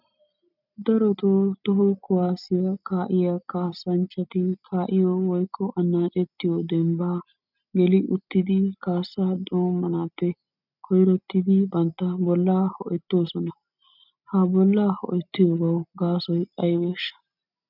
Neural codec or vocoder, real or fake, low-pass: none; real; 5.4 kHz